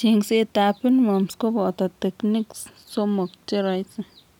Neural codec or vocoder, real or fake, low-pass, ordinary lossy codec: none; real; 19.8 kHz; none